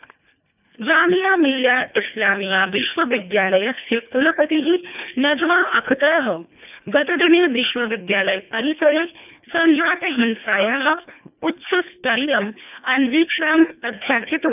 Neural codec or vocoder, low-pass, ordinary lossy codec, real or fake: codec, 24 kHz, 1.5 kbps, HILCodec; 3.6 kHz; none; fake